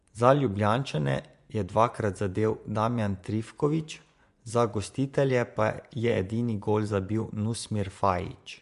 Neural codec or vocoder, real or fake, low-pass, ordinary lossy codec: vocoder, 24 kHz, 100 mel bands, Vocos; fake; 10.8 kHz; MP3, 64 kbps